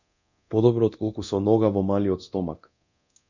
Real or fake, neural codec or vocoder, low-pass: fake; codec, 24 kHz, 0.9 kbps, DualCodec; 7.2 kHz